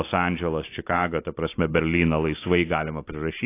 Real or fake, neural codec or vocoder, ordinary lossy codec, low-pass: real; none; AAC, 24 kbps; 3.6 kHz